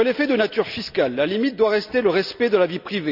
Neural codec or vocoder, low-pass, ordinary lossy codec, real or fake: none; 5.4 kHz; none; real